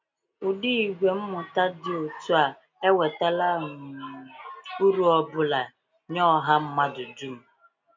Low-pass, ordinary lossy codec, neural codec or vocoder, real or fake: 7.2 kHz; MP3, 64 kbps; none; real